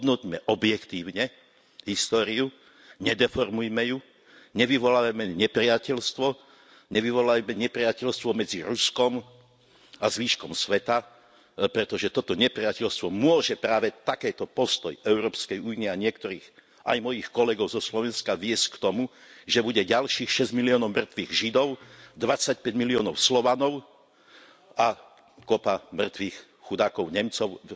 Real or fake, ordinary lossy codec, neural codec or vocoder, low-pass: real; none; none; none